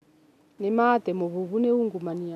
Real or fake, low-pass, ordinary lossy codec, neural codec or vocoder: real; 14.4 kHz; none; none